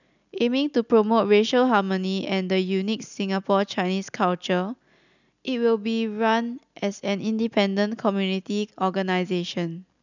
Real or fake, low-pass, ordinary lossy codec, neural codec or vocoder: real; 7.2 kHz; none; none